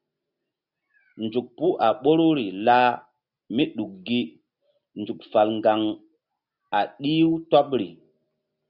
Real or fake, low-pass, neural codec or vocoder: real; 5.4 kHz; none